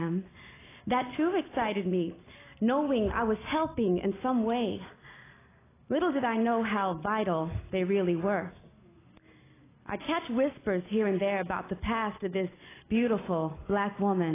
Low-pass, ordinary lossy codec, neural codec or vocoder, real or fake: 3.6 kHz; AAC, 16 kbps; none; real